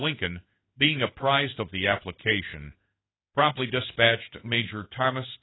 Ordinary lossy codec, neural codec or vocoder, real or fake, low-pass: AAC, 16 kbps; codec, 16 kHz in and 24 kHz out, 1 kbps, XY-Tokenizer; fake; 7.2 kHz